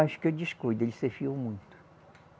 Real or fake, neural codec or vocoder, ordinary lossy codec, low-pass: real; none; none; none